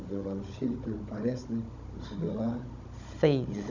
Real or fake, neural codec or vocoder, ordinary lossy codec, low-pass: fake; codec, 16 kHz, 16 kbps, FunCodec, trained on Chinese and English, 50 frames a second; none; 7.2 kHz